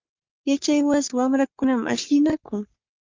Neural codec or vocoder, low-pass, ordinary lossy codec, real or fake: codec, 44.1 kHz, 3.4 kbps, Pupu-Codec; 7.2 kHz; Opus, 24 kbps; fake